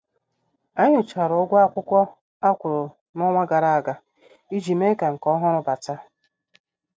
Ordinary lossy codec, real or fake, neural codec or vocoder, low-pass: none; real; none; none